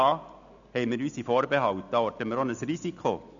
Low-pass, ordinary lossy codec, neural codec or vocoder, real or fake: 7.2 kHz; none; none; real